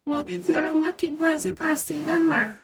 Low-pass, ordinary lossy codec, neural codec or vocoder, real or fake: none; none; codec, 44.1 kHz, 0.9 kbps, DAC; fake